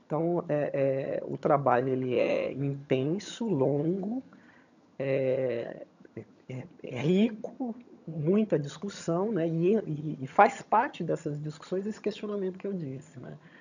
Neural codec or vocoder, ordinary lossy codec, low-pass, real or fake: vocoder, 22.05 kHz, 80 mel bands, HiFi-GAN; none; 7.2 kHz; fake